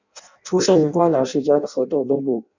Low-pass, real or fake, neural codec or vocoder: 7.2 kHz; fake; codec, 16 kHz in and 24 kHz out, 0.6 kbps, FireRedTTS-2 codec